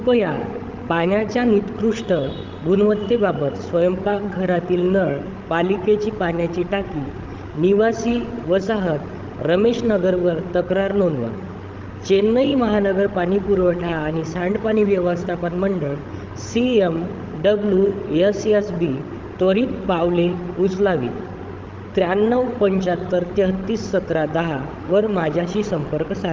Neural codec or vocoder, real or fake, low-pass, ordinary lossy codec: codec, 16 kHz, 16 kbps, FreqCodec, larger model; fake; 7.2 kHz; Opus, 32 kbps